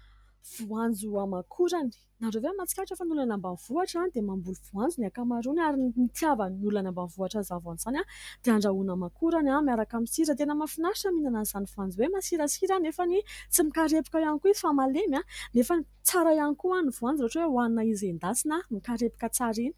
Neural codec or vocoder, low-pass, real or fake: none; 19.8 kHz; real